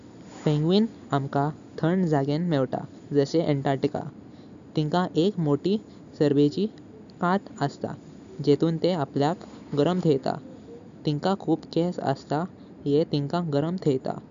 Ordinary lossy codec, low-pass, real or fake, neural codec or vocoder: none; 7.2 kHz; real; none